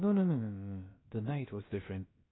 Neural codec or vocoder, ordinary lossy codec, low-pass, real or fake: codec, 16 kHz, about 1 kbps, DyCAST, with the encoder's durations; AAC, 16 kbps; 7.2 kHz; fake